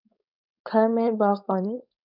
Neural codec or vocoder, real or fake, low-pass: codec, 16 kHz, 4.8 kbps, FACodec; fake; 5.4 kHz